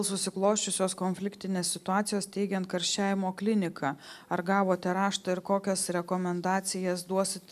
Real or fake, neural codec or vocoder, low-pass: real; none; 14.4 kHz